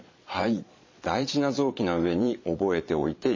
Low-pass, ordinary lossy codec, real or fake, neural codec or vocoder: 7.2 kHz; MP3, 32 kbps; fake; vocoder, 22.05 kHz, 80 mel bands, Vocos